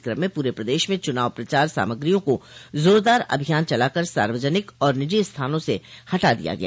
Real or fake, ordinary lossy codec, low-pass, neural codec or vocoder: real; none; none; none